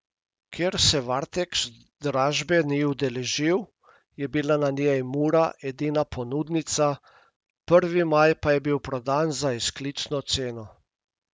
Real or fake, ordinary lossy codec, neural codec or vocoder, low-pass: real; none; none; none